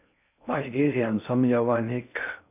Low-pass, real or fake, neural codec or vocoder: 3.6 kHz; fake; codec, 16 kHz in and 24 kHz out, 0.6 kbps, FocalCodec, streaming, 4096 codes